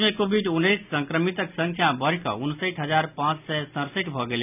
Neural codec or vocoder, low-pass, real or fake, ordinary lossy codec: none; 3.6 kHz; real; none